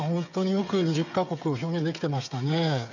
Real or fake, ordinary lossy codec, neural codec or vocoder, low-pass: fake; none; codec, 16 kHz, 8 kbps, FreqCodec, smaller model; 7.2 kHz